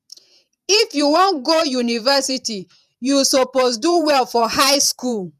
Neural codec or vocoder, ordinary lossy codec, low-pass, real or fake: vocoder, 48 kHz, 128 mel bands, Vocos; none; 14.4 kHz; fake